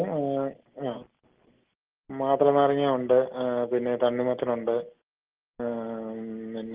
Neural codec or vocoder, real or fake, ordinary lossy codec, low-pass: none; real; Opus, 16 kbps; 3.6 kHz